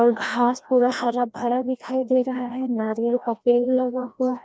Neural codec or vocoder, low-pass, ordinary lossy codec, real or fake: codec, 16 kHz, 1 kbps, FreqCodec, larger model; none; none; fake